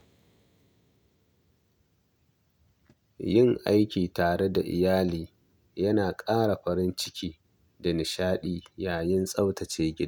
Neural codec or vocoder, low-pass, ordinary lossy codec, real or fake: none; 19.8 kHz; none; real